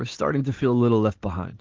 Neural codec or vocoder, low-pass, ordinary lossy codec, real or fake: none; 7.2 kHz; Opus, 16 kbps; real